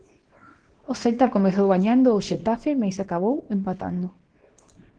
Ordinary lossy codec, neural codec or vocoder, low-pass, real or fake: Opus, 16 kbps; codec, 24 kHz, 0.9 kbps, WavTokenizer, small release; 9.9 kHz; fake